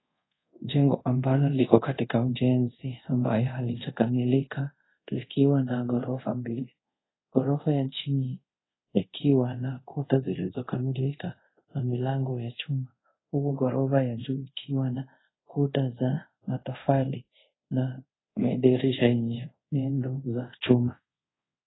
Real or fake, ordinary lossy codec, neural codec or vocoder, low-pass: fake; AAC, 16 kbps; codec, 24 kHz, 0.5 kbps, DualCodec; 7.2 kHz